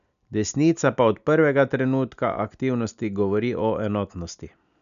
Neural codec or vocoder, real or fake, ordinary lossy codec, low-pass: none; real; none; 7.2 kHz